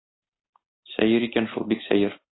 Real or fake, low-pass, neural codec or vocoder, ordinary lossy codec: real; 7.2 kHz; none; AAC, 16 kbps